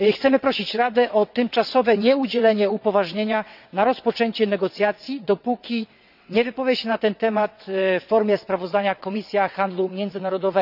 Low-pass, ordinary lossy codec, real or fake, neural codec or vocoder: 5.4 kHz; none; fake; vocoder, 22.05 kHz, 80 mel bands, WaveNeXt